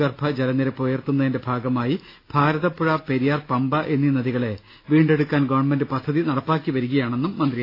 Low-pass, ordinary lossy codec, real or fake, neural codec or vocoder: 5.4 kHz; AAC, 32 kbps; real; none